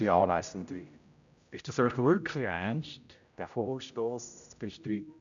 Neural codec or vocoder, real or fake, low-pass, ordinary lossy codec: codec, 16 kHz, 0.5 kbps, X-Codec, HuBERT features, trained on general audio; fake; 7.2 kHz; none